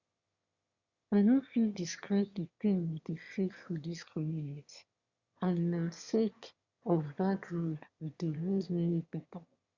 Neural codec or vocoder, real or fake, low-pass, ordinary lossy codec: autoencoder, 22.05 kHz, a latent of 192 numbers a frame, VITS, trained on one speaker; fake; 7.2 kHz; Opus, 64 kbps